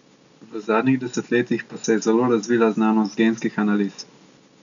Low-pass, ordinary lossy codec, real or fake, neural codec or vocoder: 7.2 kHz; none; real; none